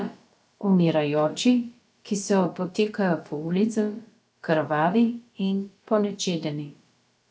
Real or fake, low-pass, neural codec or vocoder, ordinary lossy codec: fake; none; codec, 16 kHz, about 1 kbps, DyCAST, with the encoder's durations; none